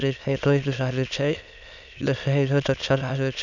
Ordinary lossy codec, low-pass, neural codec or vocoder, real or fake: none; 7.2 kHz; autoencoder, 22.05 kHz, a latent of 192 numbers a frame, VITS, trained on many speakers; fake